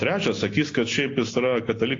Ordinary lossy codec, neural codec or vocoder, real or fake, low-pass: AAC, 32 kbps; none; real; 7.2 kHz